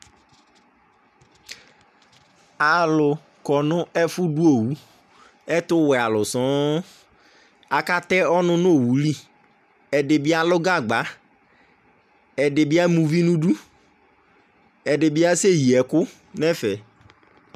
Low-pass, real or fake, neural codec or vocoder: 14.4 kHz; real; none